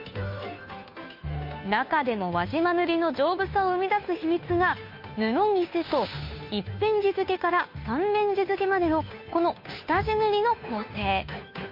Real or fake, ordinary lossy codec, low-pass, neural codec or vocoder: fake; MP3, 32 kbps; 5.4 kHz; codec, 16 kHz, 2 kbps, FunCodec, trained on Chinese and English, 25 frames a second